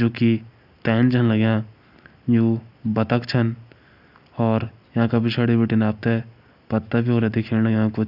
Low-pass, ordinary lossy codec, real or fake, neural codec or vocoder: 5.4 kHz; none; real; none